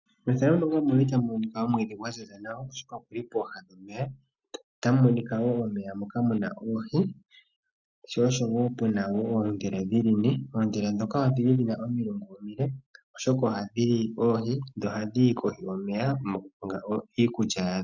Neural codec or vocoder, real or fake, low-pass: none; real; 7.2 kHz